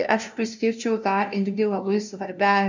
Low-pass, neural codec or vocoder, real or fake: 7.2 kHz; codec, 16 kHz, 0.5 kbps, FunCodec, trained on LibriTTS, 25 frames a second; fake